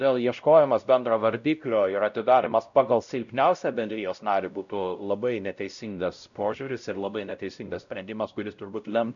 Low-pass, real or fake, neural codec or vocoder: 7.2 kHz; fake; codec, 16 kHz, 0.5 kbps, X-Codec, WavLM features, trained on Multilingual LibriSpeech